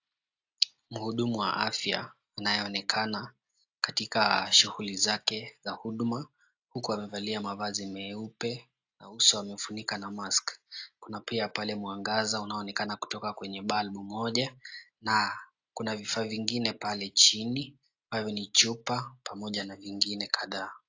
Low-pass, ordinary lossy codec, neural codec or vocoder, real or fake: 7.2 kHz; AAC, 48 kbps; none; real